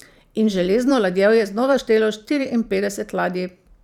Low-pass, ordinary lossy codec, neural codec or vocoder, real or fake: 19.8 kHz; none; vocoder, 44.1 kHz, 128 mel bands every 512 samples, BigVGAN v2; fake